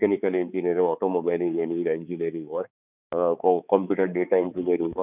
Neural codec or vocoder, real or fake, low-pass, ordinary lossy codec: codec, 16 kHz, 4 kbps, X-Codec, HuBERT features, trained on balanced general audio; fake; 3.6 kHz; none